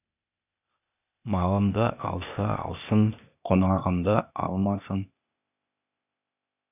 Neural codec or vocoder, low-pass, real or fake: codec, 16 kHz, 0.8 kbps, ZipCodec; 3.6 kHz; fake